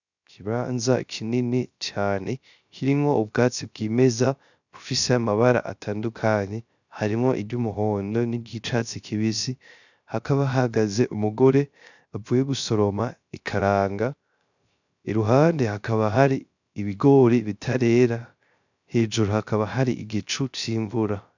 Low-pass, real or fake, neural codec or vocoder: 7.2 kHz; fake; codec, 16 kHz, 0.3 kbps, FocalCodec